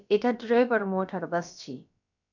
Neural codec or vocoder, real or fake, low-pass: codec, 16 kHz, about 1 kbps, DyCAST, with the encoder's durations; fake; 7.2 kHz